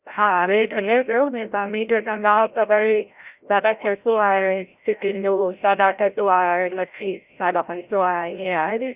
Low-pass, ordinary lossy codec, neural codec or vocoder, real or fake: 3.6 kHz; Opus, 64 kbps; codec, 16 kHz, 0.5 kbps, FreqCodec, larger model; fake